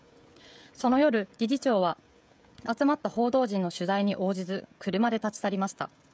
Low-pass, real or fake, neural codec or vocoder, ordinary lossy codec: none; fake; codec, 16 kHz, 16 kbps, FreqCodec, smaller model; none